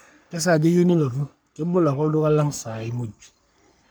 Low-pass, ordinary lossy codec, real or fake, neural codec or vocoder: none; none; fake; codec, 44.1 kHz, 3.4 kbps, Pupu-Codec